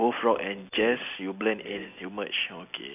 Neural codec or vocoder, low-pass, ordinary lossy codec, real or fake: none; 3.6 kHz; none; real